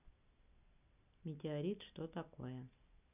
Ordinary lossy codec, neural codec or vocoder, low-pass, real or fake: none; none; 3.6 kHz; real